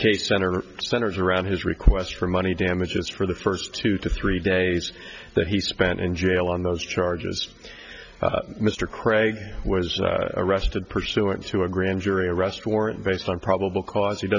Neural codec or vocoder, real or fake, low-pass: none; real; 7.2 kHz